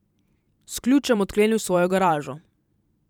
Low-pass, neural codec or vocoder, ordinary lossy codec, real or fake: 19.8 kHz; vocoder, 44.1 kHz, 128 mel bands every 512 samples, BigVGAN v2; none; fake